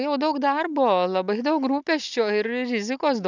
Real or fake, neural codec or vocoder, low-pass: real; none; 7.2 kHz